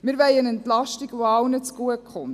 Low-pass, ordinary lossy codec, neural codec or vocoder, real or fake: 14.4 kHz; none; none; real